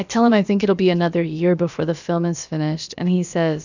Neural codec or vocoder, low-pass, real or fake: codec, 16 kHz, about 1 kbps, DyCAST, with the encoder's durations; 7.2 kHz; fake